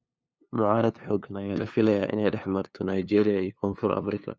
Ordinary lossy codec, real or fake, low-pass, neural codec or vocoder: none; fake; none; codec, 16 kHz, 2 kbps, FunCodec, trained on LibriTTS, 25 frames a second